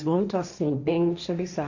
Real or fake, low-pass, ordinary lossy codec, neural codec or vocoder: fake; 7.2 kHz; none; codec, 16 kHz, 1.1 kbps, Voila-Tokenizer